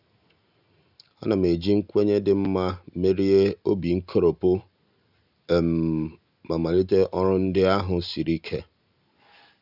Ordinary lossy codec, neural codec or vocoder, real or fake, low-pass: none; none; real; 5.4 kHz